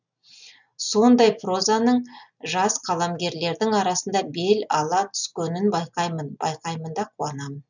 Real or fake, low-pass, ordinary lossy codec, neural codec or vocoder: real; 7.2 kHz; none; none